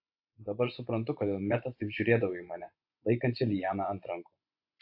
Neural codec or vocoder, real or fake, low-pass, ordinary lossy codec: vocoder, 24 kHz, 100 mel bands, Vocos; fake; 5.4 kHz; AAC, 48 kbps